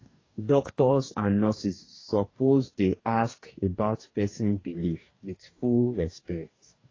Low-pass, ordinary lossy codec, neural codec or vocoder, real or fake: 7.2 kHz; AAC, 32 kbps; codec, 44.1 kHz, 2.6 kbps, DAC; fake